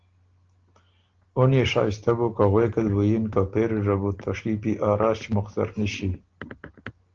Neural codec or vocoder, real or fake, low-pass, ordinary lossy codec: none; real; 7.2 kHz; Opus, 16 kbps